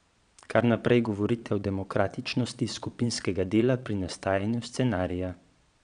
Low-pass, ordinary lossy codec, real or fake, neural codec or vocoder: 9.9 kHz; none; fake; vocoder, 22.05 kHz, 80 mel bands, Vocos